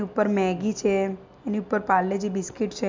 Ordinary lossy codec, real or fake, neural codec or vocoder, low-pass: MP3, 64 kbps; real; none; 7.2 kHz